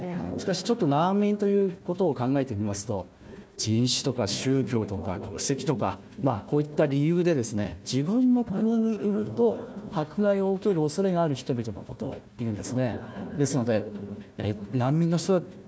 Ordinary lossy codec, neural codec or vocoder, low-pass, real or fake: none; codec, 16 kHz, 1 kbps, FunCodec, trained on Chinese and English, 50 frames a second; none; fake